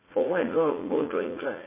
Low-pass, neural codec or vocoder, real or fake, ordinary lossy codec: 3.6 kHz; vocoder, 44.1 kHz, 80 mel bands, Vocos; fake; MP3, 16 kbps